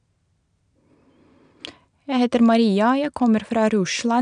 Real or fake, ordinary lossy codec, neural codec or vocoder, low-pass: real; none; none; 9.9 kHz